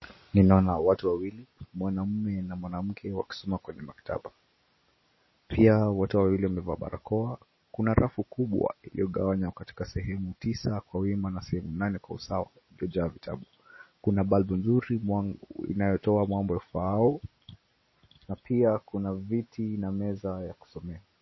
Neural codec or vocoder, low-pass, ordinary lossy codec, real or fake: none; 7.2 kHz; MP3, 24 kbps; real